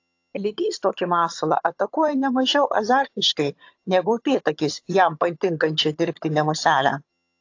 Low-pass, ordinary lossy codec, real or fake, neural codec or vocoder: 7.2 kHz; AAC, 48 kbps; fake; vocoder, 22.05 kHz, 80 mel bands, HiFi-GAN